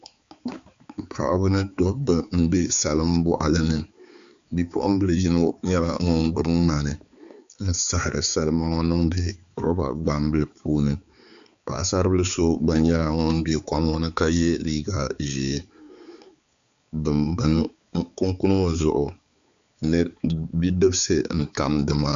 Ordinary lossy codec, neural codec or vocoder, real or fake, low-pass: MP3, 64 kbps; codec, 16 kHz, 4 kbps, X-Codec, HuBERT features, trained on balanced general audio; fake; 7.2 kHz